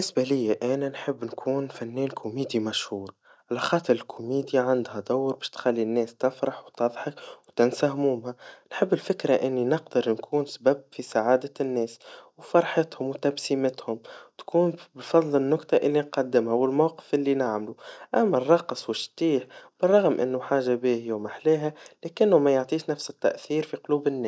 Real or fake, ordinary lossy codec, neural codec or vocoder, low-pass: real; none; none; none